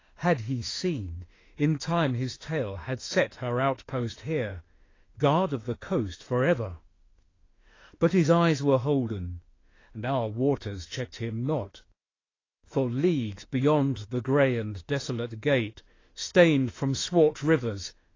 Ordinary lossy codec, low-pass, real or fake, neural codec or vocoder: AAC, 32 kbps; 7.2 kHz; fake; autoencoder, 48 kHz, 32 numbers a frame, DAC-VAE, trained on Japanese speech